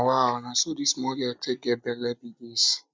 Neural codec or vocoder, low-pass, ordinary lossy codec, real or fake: none; none; none; real